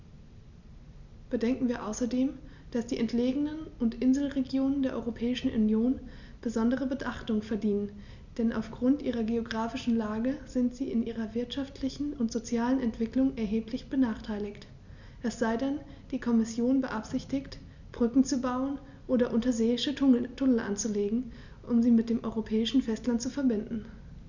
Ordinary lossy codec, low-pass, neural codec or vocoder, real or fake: none; 7.2 kHz; none; real